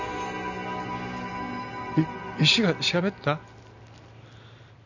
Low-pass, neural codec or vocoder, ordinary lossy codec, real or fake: 7.2 kHz; none; none; real